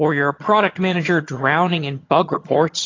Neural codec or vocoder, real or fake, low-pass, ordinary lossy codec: vocoder, 22.05 kHz, 80 mel bands, HiFi-GAN; fake; 7.2 kHz; AAC, 32 kbps